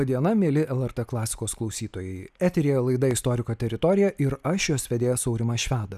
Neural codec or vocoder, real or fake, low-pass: none; real; 14.4 kHz